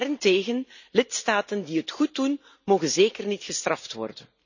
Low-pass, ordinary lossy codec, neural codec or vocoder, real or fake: 7.2 kHz; none; none; real